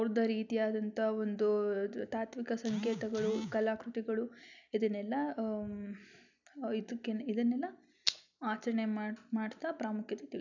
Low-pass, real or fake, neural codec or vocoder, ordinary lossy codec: 7.2 kHz; real; none; none